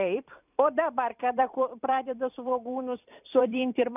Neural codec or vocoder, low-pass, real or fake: vocoder, 44.1 kHz, 128 mel bands every 256 samples, BigVGAN v2; 3.6 kHz; fake